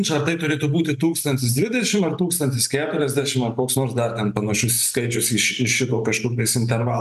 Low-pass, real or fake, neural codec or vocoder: 14.4 kHz; real; none